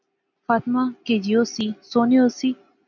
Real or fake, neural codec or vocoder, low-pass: real; none; 7.2 kHz